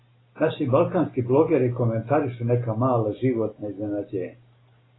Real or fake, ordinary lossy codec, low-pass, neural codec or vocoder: real; AAC, 16 kbps; 7.2 kHz; none